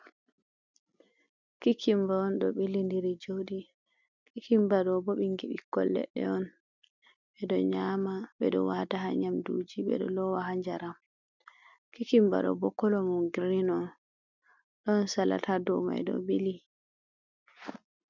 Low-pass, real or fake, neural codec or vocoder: 7.2 kHz; real; none